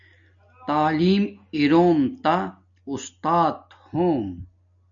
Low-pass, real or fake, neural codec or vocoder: 7.2 kHz; real; none